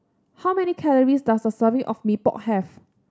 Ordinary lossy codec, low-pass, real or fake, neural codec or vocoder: none; none; real; none